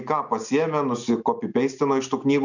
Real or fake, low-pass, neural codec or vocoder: real; 7.2 kHz; none